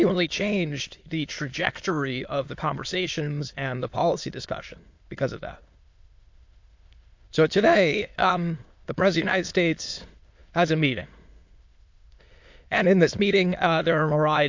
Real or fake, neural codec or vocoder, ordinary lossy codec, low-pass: fake; autoencoder, 22.05 kHz, a latent of 192 numbers a frame, VITS, trained on many speakers; MP3, 48 kbps; 7.2 kHz